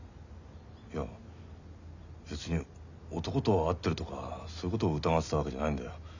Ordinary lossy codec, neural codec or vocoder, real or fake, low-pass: none; none; real; 7.2 kHz